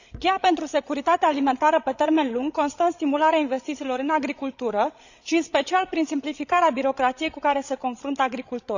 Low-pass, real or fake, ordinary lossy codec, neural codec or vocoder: 7.2 kHz; fake; none; codec, 16 kHz, 16 kbps, FreqCodec, larger model